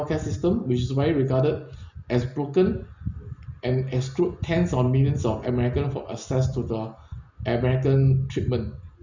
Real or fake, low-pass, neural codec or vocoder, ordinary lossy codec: real; 7.2 kHz; none; Opus, 64 kbps